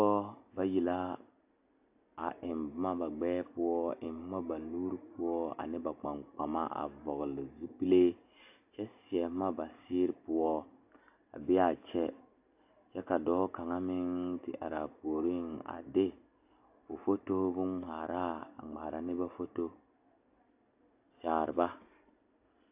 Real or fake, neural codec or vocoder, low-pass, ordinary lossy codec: real; none; 3.6 kHz; AAC, 32 kbps